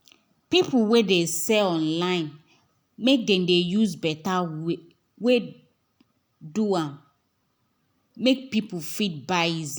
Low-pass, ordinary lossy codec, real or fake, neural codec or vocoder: none; none; real; none